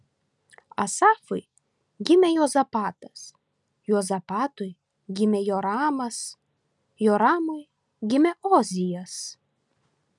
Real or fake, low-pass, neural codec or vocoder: real; 10.8 kHz; none